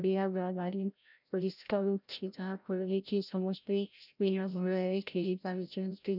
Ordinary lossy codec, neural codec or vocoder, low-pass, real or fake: none; codec, 16 kHz, 0.5 kbps, FreqCodec, larger model; 5.4 kHz; fake